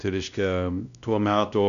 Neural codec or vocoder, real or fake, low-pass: codec, 16 kHz, 1 kbps, X-Codec, WavLM features, trained on Multilingual LibriSpeech; fake; 7.2 kHz